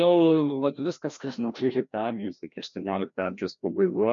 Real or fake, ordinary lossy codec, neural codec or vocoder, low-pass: fake; MP3, 64 kbps; codec, 16 kHz, 1 kbps, FreqCodec, larger model; 7.2 kHz